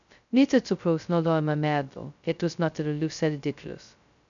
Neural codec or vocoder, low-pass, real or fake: codec, 16 kHz, 0.2 kbps, FocalCodec; 7.2 kHz; fake